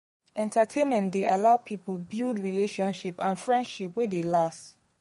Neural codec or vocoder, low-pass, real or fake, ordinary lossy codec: codec, 32 kHz, 1.9 kbps, SNAC; 14.4 kHz; fake; MP3, 48 kbps